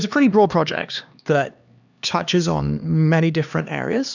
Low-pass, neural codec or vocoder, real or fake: 7.2 kHz; codec, 16 kHz, 2 kbps, X-Codec, HuBERT features, trained on LibriSpeech; fake